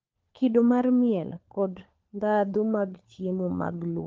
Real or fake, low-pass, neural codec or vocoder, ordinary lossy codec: fake; 7.2 kHz; codec, 16 kHz, 4 kbps, FunCodec, trained on LibriTTS, 50 frames a second; Opus, 24 kbps